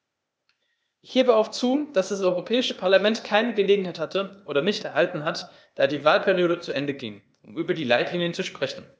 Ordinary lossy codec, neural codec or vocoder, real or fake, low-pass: none; codec, 16 kHz, 0.8 kbps, ZipCodec; fake; none